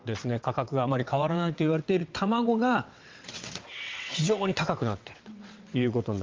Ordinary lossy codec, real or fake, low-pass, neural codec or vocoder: Opus, 32 kbps; fake; 7.2 kHz; vocoder, 22.05 kHz, 80 mel bands, WaveNeXt